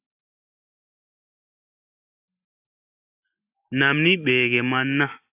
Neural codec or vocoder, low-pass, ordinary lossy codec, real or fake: none; 3.6 kHz; AAC, 24 kbps; real